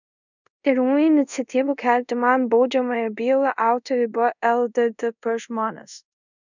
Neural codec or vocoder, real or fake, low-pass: codec, 24 kHz, 0.5 kbps, DualCodec; fake; 7.2 kHz